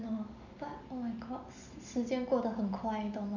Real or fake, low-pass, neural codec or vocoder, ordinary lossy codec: real; 7.2 kHz; none; none